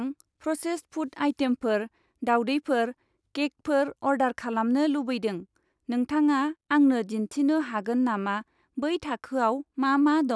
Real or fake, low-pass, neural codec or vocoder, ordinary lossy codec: real; none; none; none